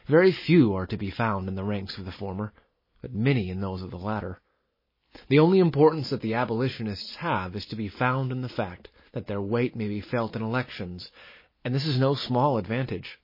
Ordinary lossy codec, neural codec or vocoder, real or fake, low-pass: MP3, 24 kbps; none; real; 5.4 kHz